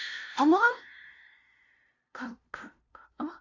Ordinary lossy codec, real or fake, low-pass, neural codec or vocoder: AAC, 48 kbps; fake; 7.2 kHz; codec, 16 kHz, 0.5 kbps, FunCodec, trained on LibriTTS, 25 frames a second